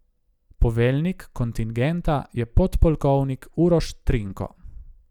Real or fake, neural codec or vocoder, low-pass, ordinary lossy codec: real; none; 19.8 kHz; none